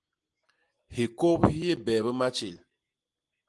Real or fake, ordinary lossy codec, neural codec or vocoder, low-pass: real; Opus, 24 kbps; none; 10.8 kHz